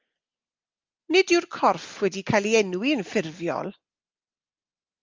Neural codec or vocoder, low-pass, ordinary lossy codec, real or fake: none; 7.2 kHz; Opus, 24 kbps; real